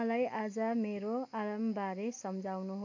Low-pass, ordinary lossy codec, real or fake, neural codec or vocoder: 7.2 kHz; AAC, 48 kbps; real; none